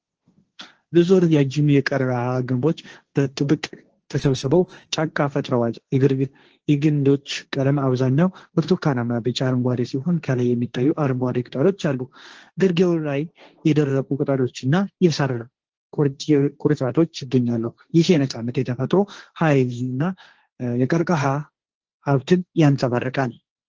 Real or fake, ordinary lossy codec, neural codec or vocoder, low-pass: fake; Opus, 16 kbps; codec, 16 kHz, 1.1 kbps, Voila-Tokenizer; 7.2 kHz